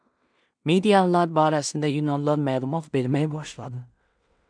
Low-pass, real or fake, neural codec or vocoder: 9.9 kHz; fake; codec, 16 kHz in and 24 kHz out, 0.4 kbps, LongCat-Audio-Codec, two codebook decoder